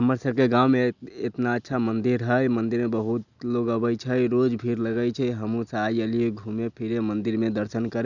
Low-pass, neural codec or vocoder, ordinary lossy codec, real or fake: 7.2 kHz; none; none; real